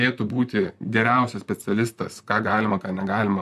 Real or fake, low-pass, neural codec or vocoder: fake; 14.4 kHz; vocoder, 44.1 kHz, 128 mel bands, Pupu-Vocoder